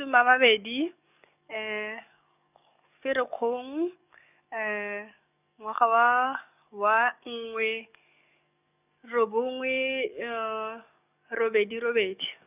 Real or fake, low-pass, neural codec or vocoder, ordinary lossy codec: fake; 3.6 kHz; codec, 44.1 kHz, 7.8 kbps, DAC; none